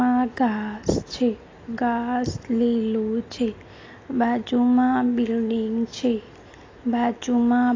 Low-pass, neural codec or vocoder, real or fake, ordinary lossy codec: 7.2 kHz; none; real; AAC, 32 kbps